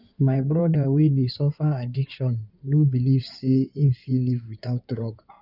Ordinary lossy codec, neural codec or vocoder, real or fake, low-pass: none; codec, 16 kHz in and 24 kHz out, 2.2 kbps, FireRedTTS-2 codec; fake; 5.4 kHz